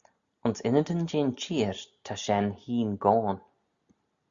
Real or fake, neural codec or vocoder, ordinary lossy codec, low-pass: real; none; Opus, 64 kbps; 7.2 kHz